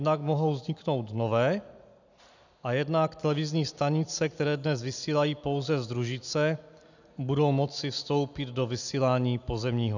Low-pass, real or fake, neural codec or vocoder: 7.2 kHz; real; none